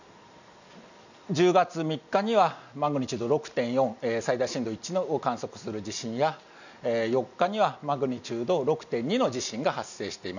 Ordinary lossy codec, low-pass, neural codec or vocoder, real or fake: none; 7.2 kHz; none; real